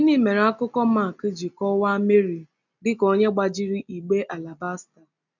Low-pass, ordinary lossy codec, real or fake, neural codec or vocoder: 7.2 kHz; none; real; none